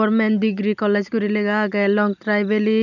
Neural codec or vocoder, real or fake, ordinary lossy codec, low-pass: none; real; none; 7.2 kHz